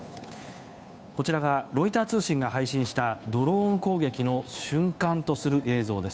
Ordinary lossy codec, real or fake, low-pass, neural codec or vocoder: none; fake; none; codec, 16 kHz, 2 kbps, FunCodec, trained on Chinese and English, 25 frames a second